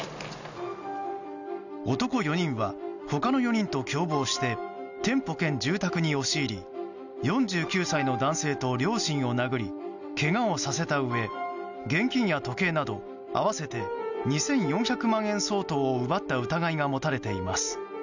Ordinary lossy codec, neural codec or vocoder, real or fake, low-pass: none; none; real; 7.2 kHz